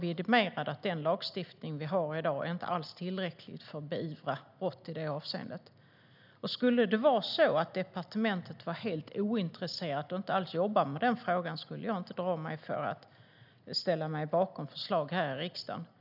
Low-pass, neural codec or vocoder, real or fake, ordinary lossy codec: 5.4 kHz; none; real; none